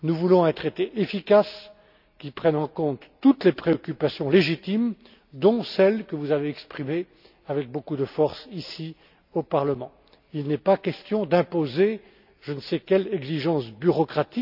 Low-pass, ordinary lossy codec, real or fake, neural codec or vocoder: 5.4 kHz; none; real; none